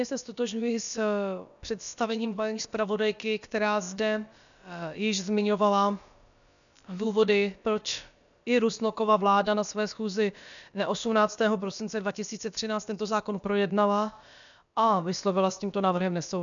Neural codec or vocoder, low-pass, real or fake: codec, 16 kHz, about 1 kbps, DyCAST, with the encoder's durations; 7.2 kHz; fake